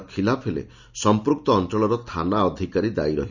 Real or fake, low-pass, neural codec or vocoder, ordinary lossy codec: real; 7.2 kHz; none; none